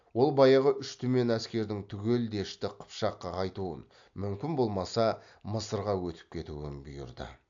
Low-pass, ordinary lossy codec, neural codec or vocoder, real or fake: 7.2 kHz; AAC, 64 kbps; none; real